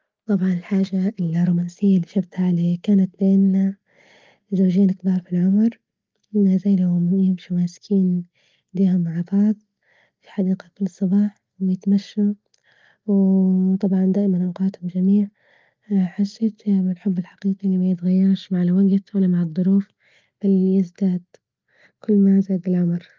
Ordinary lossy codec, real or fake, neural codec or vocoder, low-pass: Opus, 24 kbps; real; none; 7.2 kHz